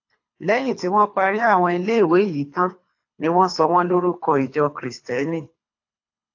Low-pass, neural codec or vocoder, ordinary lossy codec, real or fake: 7.2 kHz; codec, 24 kHz, 3 kbps, HILCodec; AAC, 48 kbps; fake